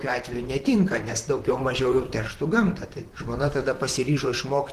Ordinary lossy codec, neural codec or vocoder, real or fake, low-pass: Opus, 16 kbps; vocoder, 44.1 kHz, 128 mel bands, Pupu-Vocoder; fake; 14.4 kHz